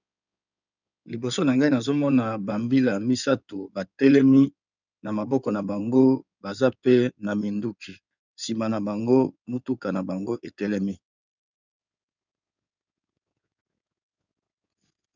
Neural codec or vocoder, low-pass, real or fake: codec, 16 kHz in and 24 kHz out, 2.2 kbps, FireRedTTS-2 codec; 7.2 kHz; fake